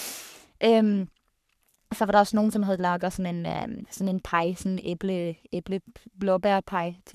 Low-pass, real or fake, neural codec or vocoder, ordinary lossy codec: 14.4 kHz; fake; codec, 44.1 kHz, 3.4 kbps, Pupu-Codec; none